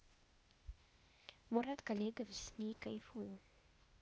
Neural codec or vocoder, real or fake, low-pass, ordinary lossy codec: codec, 16 kHz, 0.8 kbps, ZipCodec; fake; none; none